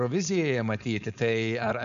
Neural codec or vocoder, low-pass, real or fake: codec, 16 kHz, 4.8 kbps, FACodec; 7.2 kHz; fake